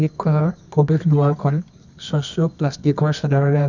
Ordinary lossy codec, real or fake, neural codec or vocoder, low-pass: none; fake; codec, 24 kHz, 0.9 kbps, WavTokenizer, medium music audio release; 7.2 kHz